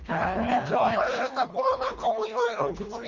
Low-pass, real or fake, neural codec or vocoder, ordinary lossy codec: 7.2 kHz; fake; codec, 24 kHz, 1.5 kbps, HILCodec; Opus, 32 kbps